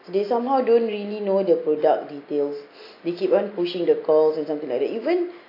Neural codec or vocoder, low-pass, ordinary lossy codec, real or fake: none; 5.4 kHz; AAC, 32 kbps; real